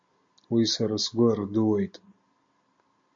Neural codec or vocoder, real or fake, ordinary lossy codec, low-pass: none; real; MP3, 48 kbps; 7.2 kHz